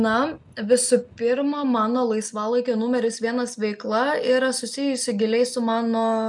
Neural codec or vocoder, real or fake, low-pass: none; real; 10.8 kHz